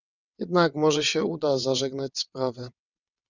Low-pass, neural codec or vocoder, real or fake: 7.2 kHz; vocoder, 22.05 kHz, 80 mel bands, WaveNeXt; fake